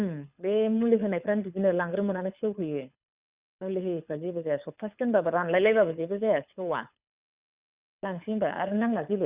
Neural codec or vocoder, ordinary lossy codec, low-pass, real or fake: codec, 24 kHz, 6 kbps, HILCodec; Opus, 64 kbps; 3.6 kHz; fake